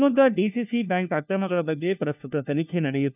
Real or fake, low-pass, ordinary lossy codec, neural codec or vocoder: fake; 3.6 kHz; none; codec, 16 kHz, 1 kbps, FunCodec, trained on LibriTTS, 50 frames a second